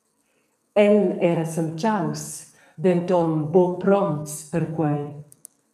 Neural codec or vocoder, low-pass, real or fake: codec, 44.1 kHz, 2.6 kbps, SNAC; 14.4 kHz; fake